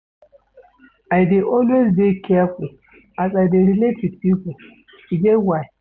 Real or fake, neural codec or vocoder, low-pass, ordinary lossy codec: real; none; none; none